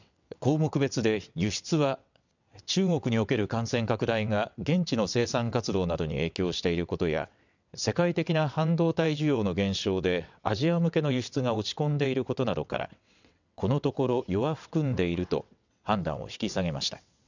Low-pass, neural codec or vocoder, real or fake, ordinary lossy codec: 7.2 kHz; vocoder, 22.05 kHz, 80 mel bands, WaveNeXt; fake; none